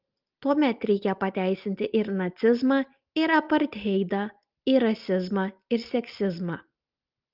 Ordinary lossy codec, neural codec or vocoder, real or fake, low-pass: Opus, 32 kbps; none; real; 5.4 kHz